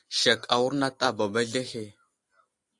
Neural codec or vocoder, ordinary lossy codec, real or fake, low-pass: none; AAC, 64 kbps; real; 10.8 kHz